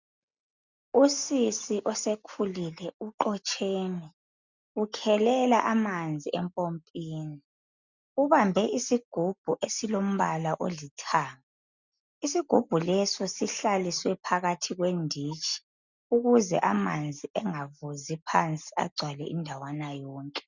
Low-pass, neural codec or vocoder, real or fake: 7.2 kHz; none; real